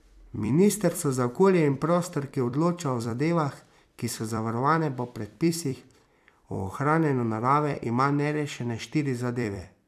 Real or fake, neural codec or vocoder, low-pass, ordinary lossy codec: fake; vocoder, 44.1 kHz, 128 mel bands every 256 samples, BigVGAN v2; 14.4 kHz; none